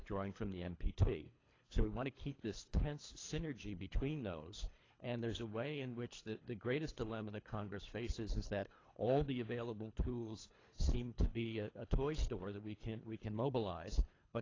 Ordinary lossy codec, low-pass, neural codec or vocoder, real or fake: AAC, 32 kbps; 7.2 kHz; codec, 24 kHz, 3 kbps, HILCodec; fake